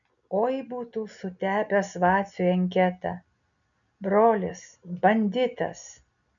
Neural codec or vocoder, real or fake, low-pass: none; real; 7.2 kHz